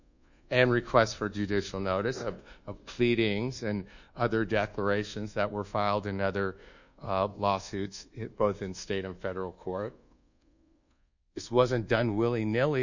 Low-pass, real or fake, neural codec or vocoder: 7.2 kHz; fake; codec, 24 kHz, 1.2 kbps, DualCodec